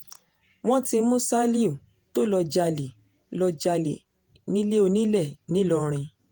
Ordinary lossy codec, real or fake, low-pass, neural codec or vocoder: Opus, 24 kbps; fake; 19.8 kHz; vocoder, 48 kHz, 128 mel bands, Vocos